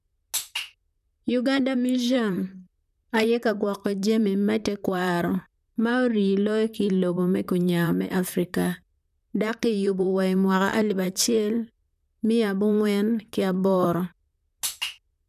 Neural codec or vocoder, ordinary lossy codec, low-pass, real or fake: vocoder, 44.1 kHz, 128 mel bands, Pupu-Vocoder; none; 14.4 kHz; fake